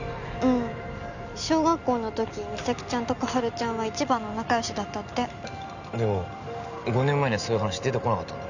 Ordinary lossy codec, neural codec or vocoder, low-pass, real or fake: none; none; 7.2 kHz; real